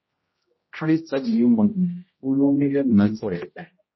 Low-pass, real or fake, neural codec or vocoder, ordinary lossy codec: 7.2 kHz; fake; codec, 16 kHz, 0.5 kbps, X-Codec, HuBERT features, trained on general audio; MP3, 24 kbps